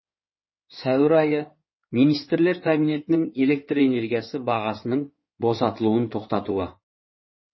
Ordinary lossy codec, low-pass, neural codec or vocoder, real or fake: MP3, 24 kbps; 7.2 kHz; codec, 16 kHz in and 24 kHz out, 2.2 kbps, FireRedTTS-2 codec; fake